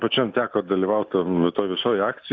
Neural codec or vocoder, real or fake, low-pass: none; real; 7.2 kHz